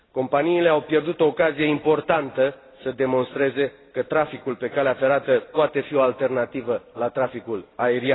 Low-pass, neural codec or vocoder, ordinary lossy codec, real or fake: 7.2 kHz; none; AAC, 16 kbps; real